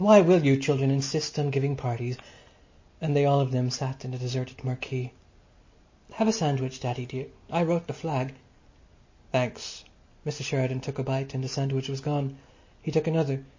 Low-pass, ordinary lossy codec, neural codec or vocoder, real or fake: 7.2 kHz; MP3, 32 kbps; none; real